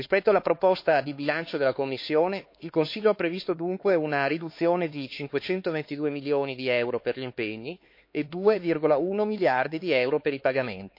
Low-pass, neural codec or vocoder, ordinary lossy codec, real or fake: 5.4 kHz; codec, 16 kHz, 4 kbps, X-Codec, HuBERT features, trained on LibriSpeech; MP3, 32 kbps; fake